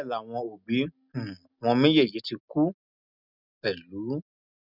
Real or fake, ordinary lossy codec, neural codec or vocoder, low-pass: real; none; none; 5.4 kHz